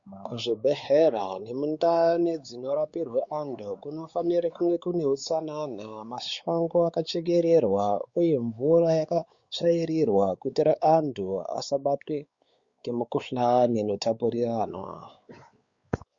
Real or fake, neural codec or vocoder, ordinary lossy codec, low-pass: fake; codec, 16 kHz, 4 kbps, X-Codec, WavLM features, trained on Multilingual LibriSpeech; Opus, 64 kbps; 7.2 kHz